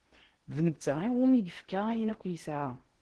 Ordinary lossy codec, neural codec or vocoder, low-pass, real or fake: Opus, 16 kbps; codec, 16 kHz in and 24 kHz out, 0.8 kbps, FocalCodec, streaming, 65536 codes; 10.8 kHz; fake